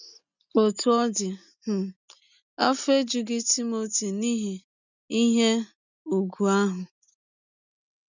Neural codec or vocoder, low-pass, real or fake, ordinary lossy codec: none; 7.2 kHz; real; none